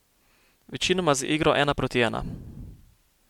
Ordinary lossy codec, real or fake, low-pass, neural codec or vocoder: MP3, 96 kbps; real; 19.8 kHz; none